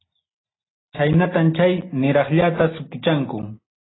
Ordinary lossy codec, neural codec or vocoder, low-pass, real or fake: AAC, 16 kbps; none; 7.2 kHz; real